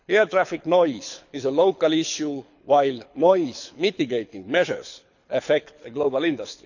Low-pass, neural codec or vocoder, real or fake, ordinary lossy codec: 7.2 kHz; codec, 24 kHz, 6 kbps, HILCodec; fake; none